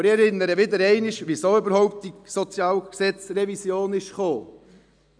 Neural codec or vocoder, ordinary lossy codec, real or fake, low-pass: none; none; real; 9.9 kHz